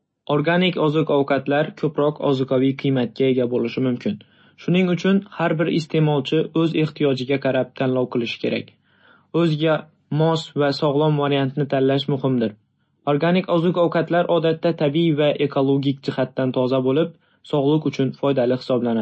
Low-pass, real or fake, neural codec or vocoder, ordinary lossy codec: 9.9 kHz; real; none; MP3, 32 kbps